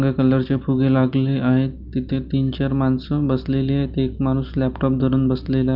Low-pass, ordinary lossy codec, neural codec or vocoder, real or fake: 5.4 kHz; Opus, 24 kbps; none; real